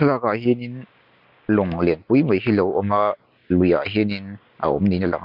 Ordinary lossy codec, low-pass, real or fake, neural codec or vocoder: none; 5.4 kHz; real; none